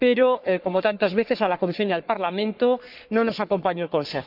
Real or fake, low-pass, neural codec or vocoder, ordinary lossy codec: fake; 5.4 kHz; codec, 44.1 kHz, 3.4 kbps, Pupu-Codec; none